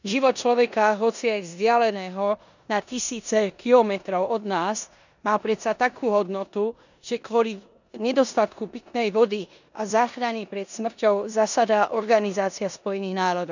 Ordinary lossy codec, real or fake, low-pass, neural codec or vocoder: none; fake; 7.2 kHz; codec, 16 kHz in and 24 kHz out, 0.9 kbps, LongCat-Audio-Codec, four codebook decoder